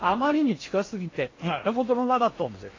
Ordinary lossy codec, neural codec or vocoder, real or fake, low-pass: AAC, 32 kbps; codec, 16 kHz in and 24 kHz out, 0.8 kbps, FocalCodec, streaming, 65536 codes; fake; 7.2 kHz